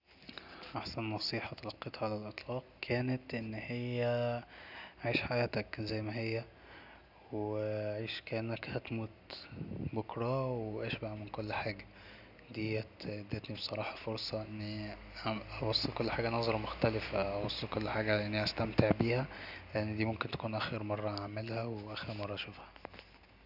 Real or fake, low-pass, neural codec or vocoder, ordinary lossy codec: real; 5.4 kHz; none; none